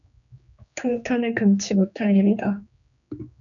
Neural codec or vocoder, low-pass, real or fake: codec, 16 kHz, 2 kbps, X-Codec, HuBERT features, trained on general audio; 7.2 kHz; fake